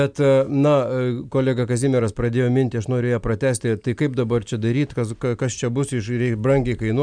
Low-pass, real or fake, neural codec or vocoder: 9.9 kHz; real; none